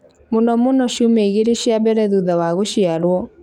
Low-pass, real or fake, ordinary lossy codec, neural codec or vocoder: 19.8 kHz; fake; none; codec, 44.1 kHz, 7.8 kbps, DAC